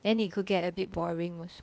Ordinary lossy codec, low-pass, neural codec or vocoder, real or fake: none; none; codec, 16 kHz, 0.8 kbps, ZipCodec; fake